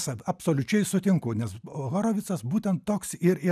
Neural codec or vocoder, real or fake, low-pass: none; real; 14.4 kHz